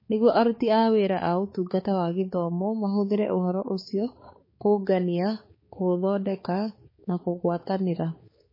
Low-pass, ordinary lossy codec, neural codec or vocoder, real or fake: 5.4 kHz; MP3, 24 kbps; codec, 16 kHz, 4 kbps, X-Codec, HuBERT features, trained on balanced general audio; fake